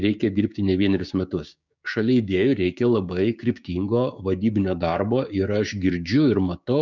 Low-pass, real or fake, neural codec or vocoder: 7.2 kHz; fake; codec, 16 kHz, 6 kbps, DAC